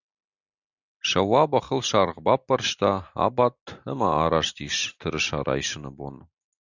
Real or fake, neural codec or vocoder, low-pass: real; none; 7.2 kHz